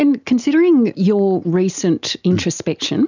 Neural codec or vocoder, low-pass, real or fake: none; 7.2 kHz; real